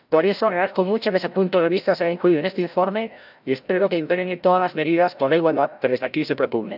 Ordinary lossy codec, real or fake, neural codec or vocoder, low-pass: none; fake; codec, 16 kHz, 0.5 kbps, FreqCodec, larger model; 5.4 kHz